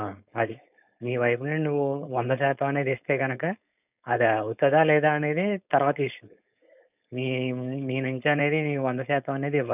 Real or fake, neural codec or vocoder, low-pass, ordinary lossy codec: fake; codec, 16 kHz, 4.8 kbps, FACodec; 3.6 kHz; none